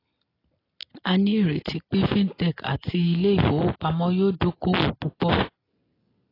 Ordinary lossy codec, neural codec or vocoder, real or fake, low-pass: AAC, 24 kbps; none; real; 5.4 kHz